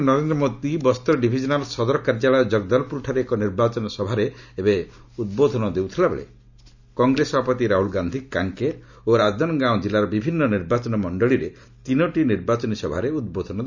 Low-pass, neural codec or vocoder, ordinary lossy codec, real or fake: 7.2 kHz; none; none; real